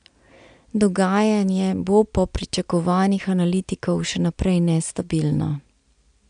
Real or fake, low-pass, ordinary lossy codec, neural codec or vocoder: real; 9.9 kHz; AAC, 96 kbps; none